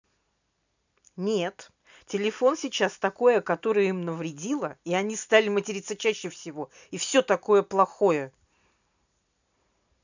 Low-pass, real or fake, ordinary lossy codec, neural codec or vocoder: 7.2 kHz; real; none; none